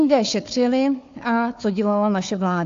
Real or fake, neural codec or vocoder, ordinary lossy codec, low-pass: fake; codec, 16 kHz, 4 kbps, FunCodec, trained on Chinese and English, 50 frames a second; AAC, 48 kbps; 7.2 kHz